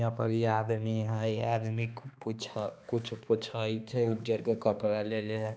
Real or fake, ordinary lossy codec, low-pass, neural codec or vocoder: fake; none; none; codec, 16 kHz, 2 kbps, X-Codec, HuBERT features, trained on balanced general audio